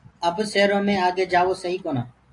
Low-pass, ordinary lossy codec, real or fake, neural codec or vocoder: 10.8 kHz; AAC, 48 kbps; real; none